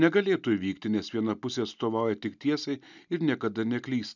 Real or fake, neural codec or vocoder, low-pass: real; none; 7.2 kHz